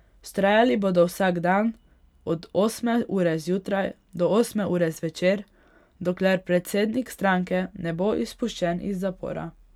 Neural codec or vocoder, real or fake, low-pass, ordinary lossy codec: none; real; 19.8 kHz; none